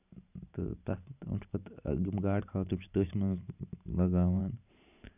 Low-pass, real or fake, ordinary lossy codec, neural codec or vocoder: 3.6 kHz; fake; none; vocoder, 44.1 kHz, 128 mel bands every 512 samples, BigVGAN v2